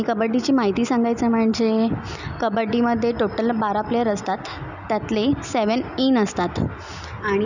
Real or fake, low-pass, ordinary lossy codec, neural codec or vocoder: real; 7.2 kHz; none; none